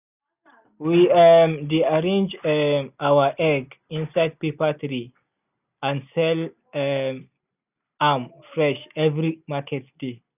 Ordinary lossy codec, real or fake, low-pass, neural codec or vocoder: none; real; 3.6 kHz; none